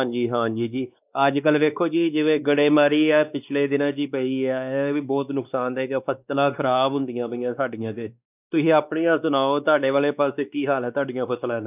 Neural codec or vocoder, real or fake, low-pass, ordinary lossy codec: codec, 16 kHz, 2 kbps, X-Codec, WavLM features, trained on Multilingual LibriSpeech; fake; 3.6 kHz; none